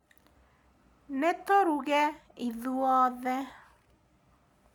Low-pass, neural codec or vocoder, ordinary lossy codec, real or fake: 19.8 kHz; none; none; real